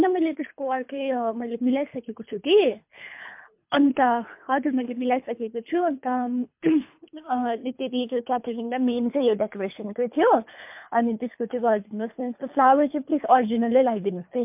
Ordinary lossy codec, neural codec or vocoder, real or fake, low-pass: MP3, 32 kbps; codec, 24 kHz, 3 kbps, HILCodec; fake; 3.6 kHz